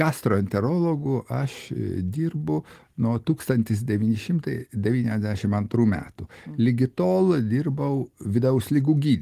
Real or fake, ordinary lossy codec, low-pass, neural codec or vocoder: real; Opus, 24 kbps; 14.4 kHz; none